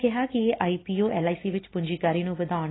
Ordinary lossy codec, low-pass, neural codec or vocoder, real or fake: AAC, 16 kbps; 7.2 kHz; none; real